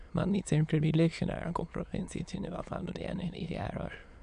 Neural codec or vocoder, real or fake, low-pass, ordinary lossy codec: autoencoder, 22.05 kHz, a latent of 192 numbers a frame, VITS, trained on many speakers; fake; 9.9 kHz; AAC, 64 kbps